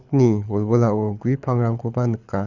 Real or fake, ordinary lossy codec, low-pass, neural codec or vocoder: fake; none; 7.2 kHz; codec, 24 kHz, 6 kbps, HILCodec